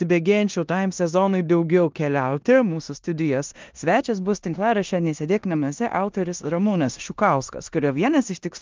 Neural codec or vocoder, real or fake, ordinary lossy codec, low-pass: codec, 16 kHz in and 24 kHz out, 0.9 kbps, LongCat-Audio-Codec, four codebook decoder; fake; Opus, 24 kbps; 7.2 kHz